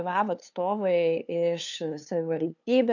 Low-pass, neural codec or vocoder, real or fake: 7.2 kHz; codec, 16 kHz, 2 kbps, FunCodec, trained on LibriTTS, 25 frames a second; fake